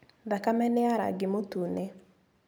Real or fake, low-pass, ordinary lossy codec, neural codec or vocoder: real; none; none; none